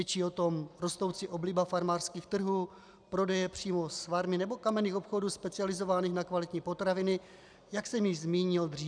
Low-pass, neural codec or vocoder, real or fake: 9.9 kHz; none; real